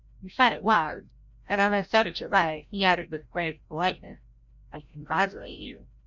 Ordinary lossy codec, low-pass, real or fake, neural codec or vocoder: MP3, 64 kbps; 7.2 kHz; fake; codec, 16 kHz, 0.5 kbps, FreqCodec, larger model